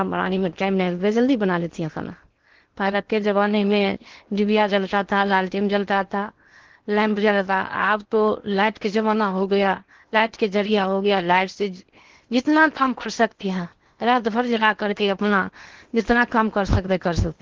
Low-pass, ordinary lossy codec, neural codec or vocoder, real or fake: 7.2 kHz; Opus, 16 kbps; codec, 16 kHz in and 24 kHz out, 0.8 kbps, FocalCodec, streaming, 65536 codes; fake